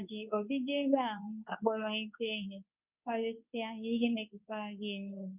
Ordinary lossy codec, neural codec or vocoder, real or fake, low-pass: none; codec, 24 kHz, 0.9 kbps, WavTokenizer, medium speech release version 2; fake; 3.6 kHz